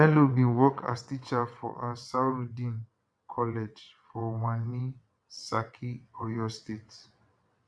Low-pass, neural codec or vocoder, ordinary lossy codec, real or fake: none; vocoder, 22.05 kHz, 80 mel bands, WaveNeXt; none; fake